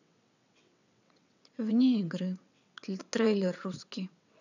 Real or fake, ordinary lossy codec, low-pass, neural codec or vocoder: fake; none; 7.2 kHz; vocoder, 22.05 kHz, 80 mel bands, WaveNeXt